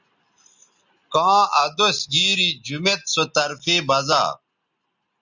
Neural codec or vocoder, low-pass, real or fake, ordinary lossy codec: none; 7.2 kHz; real; Opus, 64 kbps